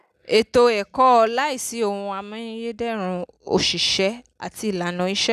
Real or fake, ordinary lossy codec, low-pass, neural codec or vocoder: real; none; 14.4 kHz; none